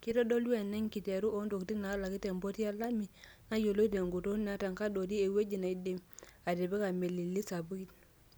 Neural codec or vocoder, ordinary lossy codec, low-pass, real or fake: none; none; none; real